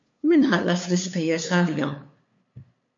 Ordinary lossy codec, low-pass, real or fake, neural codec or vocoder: MP3, 48 kbps; 7.2 kHz; fake; codec, 16 kHz, 2 kbps, FunCodec, trained on LibriTTS, 25 frames a second